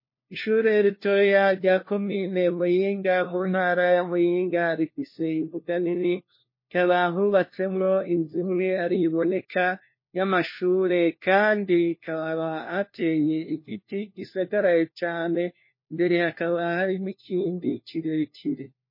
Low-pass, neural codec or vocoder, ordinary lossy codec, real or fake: 5.4 kHz; codec, 16 kHz, 1 kbps, FunCodec, trained on LibriTTS, 50 frames a second; MP3, 24 kbps; fake